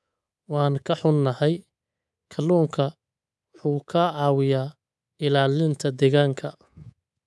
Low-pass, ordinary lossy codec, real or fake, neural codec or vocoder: none; none; fake; codec, 24 kHz, 3.1 kbps, DualCodec